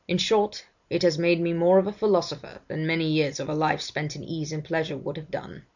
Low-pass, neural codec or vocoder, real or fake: 7.2 kHz; none; real